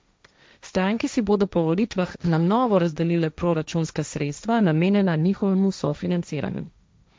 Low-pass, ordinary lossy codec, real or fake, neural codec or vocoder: none; none; fake; codec, 16 kHz, 1.1 kbps, Voila-Tokenizer